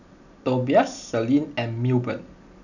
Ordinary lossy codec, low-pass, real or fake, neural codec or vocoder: none; 7.2 kHz; real; none